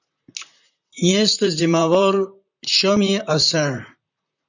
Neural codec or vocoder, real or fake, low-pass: vocoder, 44.1 kHz, 128 mel bands, Pupu-Vocoder; fake; 7.2 kHz